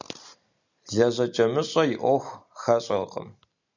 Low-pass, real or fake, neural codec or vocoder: 7.2 kHz; real; none